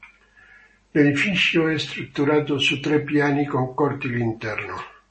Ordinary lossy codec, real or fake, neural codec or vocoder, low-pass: MP3, 32 kbps; real; none; 10.8 kHz